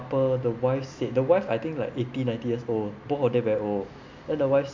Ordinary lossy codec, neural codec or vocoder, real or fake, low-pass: MP3, 64 kbps; none; real; 7.2 kHz